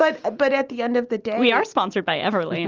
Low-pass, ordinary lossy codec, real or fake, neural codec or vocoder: 7.2 kHz; Opus, 24 kbps; real; none